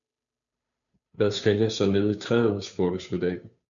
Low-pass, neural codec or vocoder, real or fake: 7.2 kHz; codec, 16 kHz, 2 kbps, FunCodec, trained on Chinese and English, 25 frames a second; fake